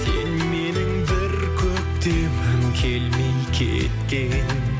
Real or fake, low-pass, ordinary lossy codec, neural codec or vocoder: real; none; none; none